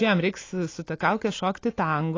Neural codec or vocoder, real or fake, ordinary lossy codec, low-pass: none; real; AAC, 32 kbps; 7.2 kHz